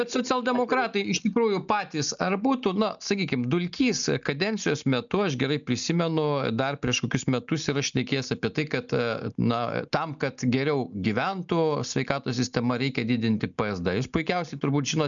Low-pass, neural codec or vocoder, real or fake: 7.2 kHz; none; real